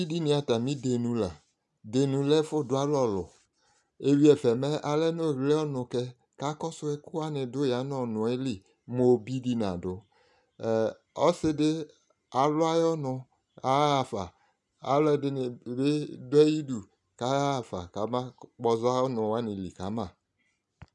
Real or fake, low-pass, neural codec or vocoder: real; 10.8 kHz; none